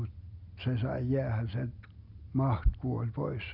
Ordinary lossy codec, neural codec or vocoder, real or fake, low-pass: none; none; real; 5.4 kHz